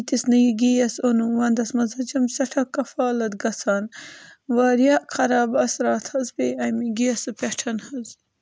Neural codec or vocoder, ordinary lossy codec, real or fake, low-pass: none; none; real; none